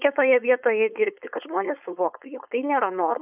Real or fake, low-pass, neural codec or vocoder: fake; 3.6 kHz; codec, 16 kHz, 4.8 kbps, FACodec